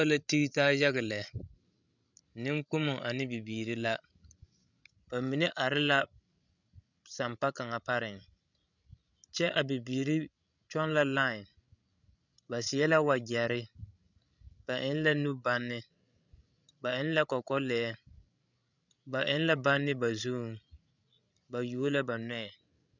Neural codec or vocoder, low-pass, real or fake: codec, 16 kHz, 16 kbps, FreqCodec, larger model; 7.2 kHz; fake